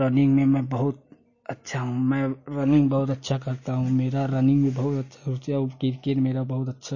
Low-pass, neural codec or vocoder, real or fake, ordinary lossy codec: 7.2 kHz; none; real; MP3, 32 kbps